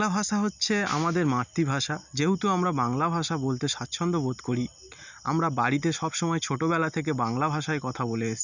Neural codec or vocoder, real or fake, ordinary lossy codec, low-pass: none; real; none; 7.2 kHz